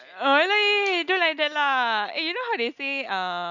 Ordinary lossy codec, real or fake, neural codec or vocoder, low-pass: none; real; none; 7.2 kHz